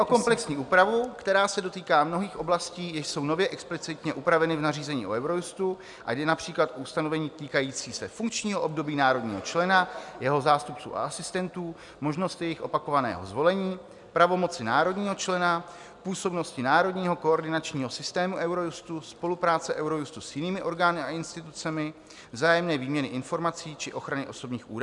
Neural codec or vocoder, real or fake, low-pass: none; real; 10.8 kHz